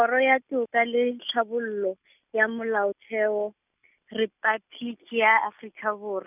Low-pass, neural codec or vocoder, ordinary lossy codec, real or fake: 3.6 kHz; none; none; real